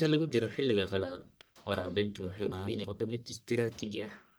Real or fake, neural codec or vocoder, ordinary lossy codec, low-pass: fake; codec, 44.1 kHz, 1.7 kbps, Pupu-Codec; none; none